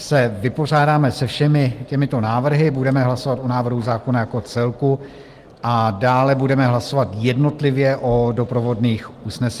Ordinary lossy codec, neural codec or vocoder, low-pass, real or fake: Opus, 24 kbps; none; 14.4 kHz; real